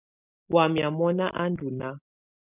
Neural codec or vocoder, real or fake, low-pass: none; real; 3.6 kHz